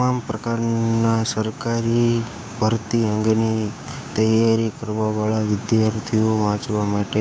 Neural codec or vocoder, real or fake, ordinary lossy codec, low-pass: codec, 16 kHz, 6 kbps, DAC; fake; none; none